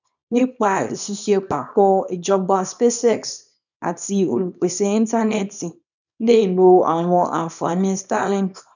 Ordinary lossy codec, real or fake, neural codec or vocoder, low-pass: none; fake; codec, 24 kHz, 0.9 kbps, WavTokenizer, small release; 7.2 kHz